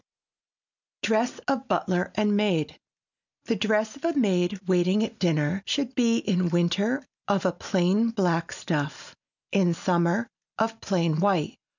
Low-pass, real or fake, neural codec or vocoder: 7.2 kHz; real; none